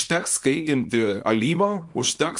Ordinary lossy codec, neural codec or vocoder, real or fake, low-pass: MP3, 64 kbps; codec, 24 kHz, 0.9 kbps, WavTokenizer, small release; fake; 10.8 kHz